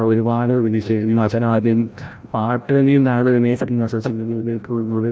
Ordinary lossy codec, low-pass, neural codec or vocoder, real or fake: none; none; codec, 16 kHz, 0.5 kbps, FreqCodec, larger model; fake